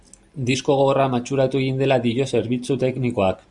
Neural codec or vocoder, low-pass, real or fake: none; 10.8 kHz; real